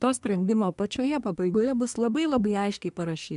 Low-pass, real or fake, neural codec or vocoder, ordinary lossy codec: 10.8 kHz; fake; codec, 24 kHz, 1 kbps, SNAC; MP3, 96 kbps